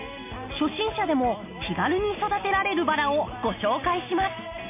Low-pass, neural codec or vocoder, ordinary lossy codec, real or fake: 3.6 kHz; none; MP3, 24 kbps; real